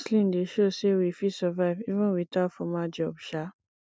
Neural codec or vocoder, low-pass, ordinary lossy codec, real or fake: none; none; none; real